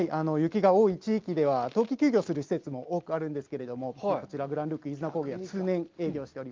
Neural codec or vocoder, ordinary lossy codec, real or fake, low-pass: none; Opus, 32 kbps; real; 7.2 kHz